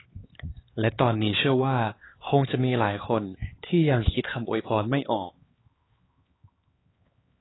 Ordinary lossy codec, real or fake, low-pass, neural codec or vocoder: AAC, 16 kbps; fake; 7.2 kHz; codec, 16 kHz, 4 kbps, X-Codec, HuBERT features, trained on LibriSpeech